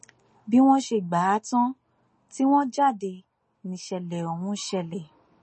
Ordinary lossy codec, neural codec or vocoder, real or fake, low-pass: MP3, 32 kbps; none; real; 9.9 kHz